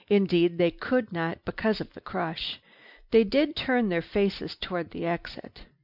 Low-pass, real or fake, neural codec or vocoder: 5.4 kHz; real; none